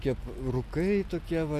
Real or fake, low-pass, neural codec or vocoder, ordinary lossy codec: real; 14.4 kHz; none; MP3, 96 kbps